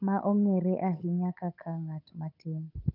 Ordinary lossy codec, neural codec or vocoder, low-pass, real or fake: none; codec, 16 kHz, 8 kbps, FunCodec, trained on Chinese and English, 25 frames a second; 5.4 kHz; fake